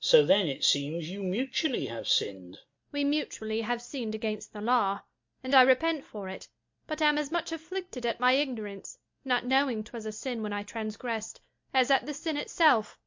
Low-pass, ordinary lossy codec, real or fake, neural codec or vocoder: 7.2 kHz; MP3, 48 kbps; real; none